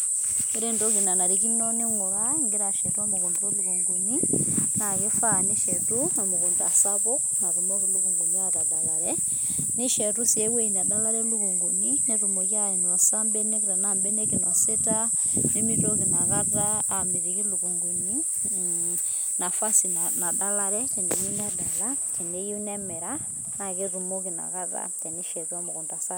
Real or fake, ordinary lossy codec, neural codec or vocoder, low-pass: real; none; none; none